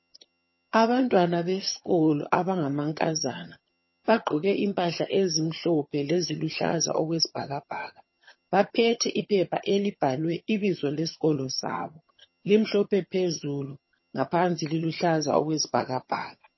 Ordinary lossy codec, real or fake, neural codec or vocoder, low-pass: MP3, 24 kbps; fake; vocoder, 22.05 kHz, 80 mel bands, HiFi-GAN; 7.2 kHz